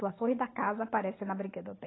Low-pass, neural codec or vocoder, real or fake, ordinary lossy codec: 7.2 kHz; none; real; AAC, 16 kbps